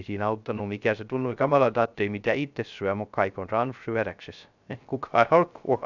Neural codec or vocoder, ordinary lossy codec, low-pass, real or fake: codec, 16 kHz, 0.3 kbps, FocalCodec; none; 7.2 kHz; fake